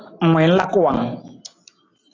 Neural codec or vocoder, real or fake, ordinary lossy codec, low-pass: none; real; AAC, 32 kbps; 7.2 kHz